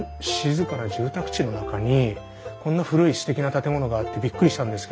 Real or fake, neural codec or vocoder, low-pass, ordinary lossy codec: real; none; none; none